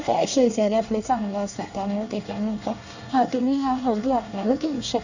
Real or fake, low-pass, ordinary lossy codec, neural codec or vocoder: fake; 7.2 kHz; none; codec, 24 kHz, 1 kbps, SNAC